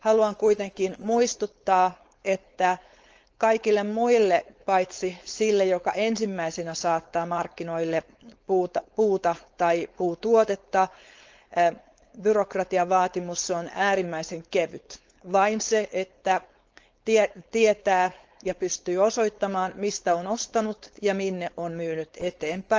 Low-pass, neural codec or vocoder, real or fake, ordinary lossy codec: 7.2 kHz; codec, 16 kHz, 4.8 kbps, FACodec; fake; Opus, 24 kbps